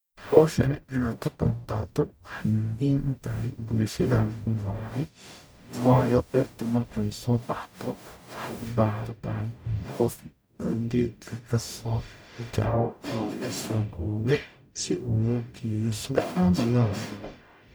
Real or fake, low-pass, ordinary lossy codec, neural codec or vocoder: fake; none; none; codec, 44.1 kHz, 0.9 kbps, DAC